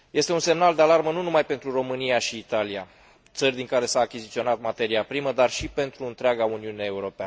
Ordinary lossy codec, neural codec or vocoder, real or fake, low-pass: none; none; real; none